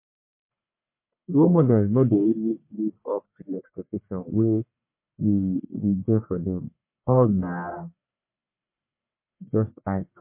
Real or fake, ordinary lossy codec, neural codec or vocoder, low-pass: fake; MP3, 24 kbps; codec, 44.1 kHz, 1.7 kbps, Pupu-Codec; 3.6 kHz